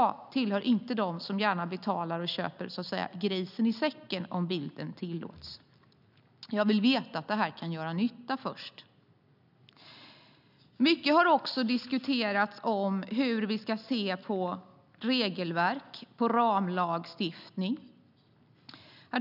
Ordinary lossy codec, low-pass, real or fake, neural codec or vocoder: none; 5.4 kHz; real; none